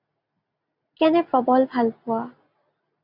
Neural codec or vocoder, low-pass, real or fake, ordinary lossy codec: none; 5.4 kHz; real; MP3, 48 kbps